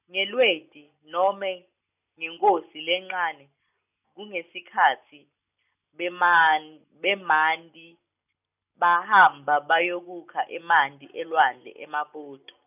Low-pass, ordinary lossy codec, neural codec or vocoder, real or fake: 3.6 kHz; none; none; real